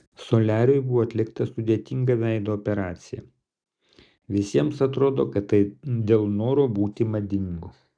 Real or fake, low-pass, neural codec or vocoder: real; 9.9 kHz; none